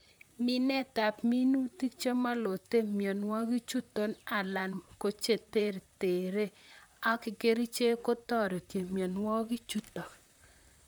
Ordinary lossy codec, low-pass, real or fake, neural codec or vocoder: none; none; fake; vocoder, 44.1 kHz, 128 mel bands, Pupu-Vocoder